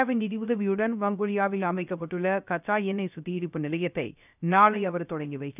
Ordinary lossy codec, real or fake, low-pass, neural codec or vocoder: none; fake; 3.6 kHz; codec, 16 kHz, 0.3 kbps, FocalCodec